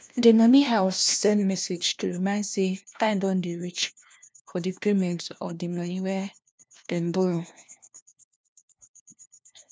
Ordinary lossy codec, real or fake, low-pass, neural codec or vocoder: none; fake; none; codec, 16 kHz, 1 kbps, FunCodec, trained on LibriTTS, 50 frames a second